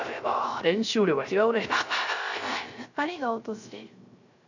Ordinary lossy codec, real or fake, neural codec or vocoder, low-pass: none; fake; codec, 16 kHz, 0.3 kbps, FocalCodec; 7.2 kHz